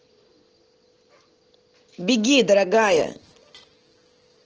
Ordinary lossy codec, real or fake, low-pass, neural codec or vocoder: Opus, 16 kbps; real; 7.2 kHz; none